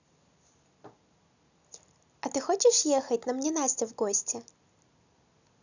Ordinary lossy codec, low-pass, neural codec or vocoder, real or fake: none; 7.2 kHz; none; real